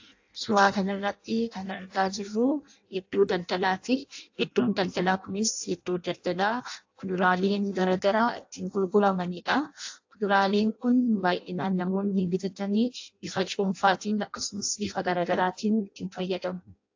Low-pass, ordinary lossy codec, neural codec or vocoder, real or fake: 7.2 kHz; AAC, 48 kbps; codec, 16 kHz in and 24 kHz out, 0.6 kbps, FireRedTTS-2 codec; fake